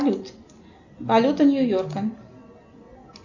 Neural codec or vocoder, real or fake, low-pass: none; real; 7.2 kHz